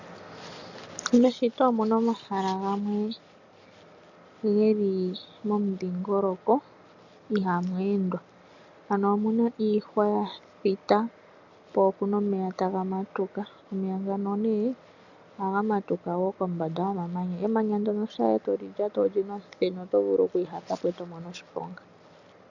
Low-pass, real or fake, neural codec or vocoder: 7.2 kHz; real; none